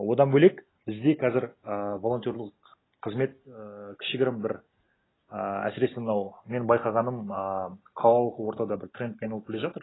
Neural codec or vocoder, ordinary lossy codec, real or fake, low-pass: none; AAC, 16 kbps; real; 7.2 kHz